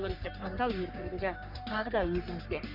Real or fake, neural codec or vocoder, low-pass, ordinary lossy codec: fake; codec, 44.1 kHz, 3.4 kbps, Pupu-Codec; 5.4 kHz; none